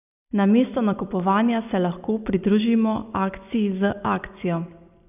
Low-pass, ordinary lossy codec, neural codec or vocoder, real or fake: 3.6 kHz; none; none; real